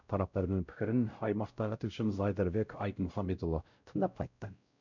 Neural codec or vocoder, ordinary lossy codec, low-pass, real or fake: codec, 16 kHz, 0.5 kbps, X-Codec, WavLM features, trained on Multilingual LibriSpeech; none; 7.2 kHz; fake